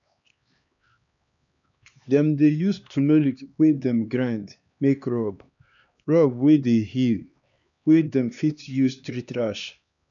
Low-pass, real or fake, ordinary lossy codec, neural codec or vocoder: 7.2 kHz; fake; none; codec, 16 kHz, 2 kbps, X-Codec, HuBERT features, trained on LibriSpeech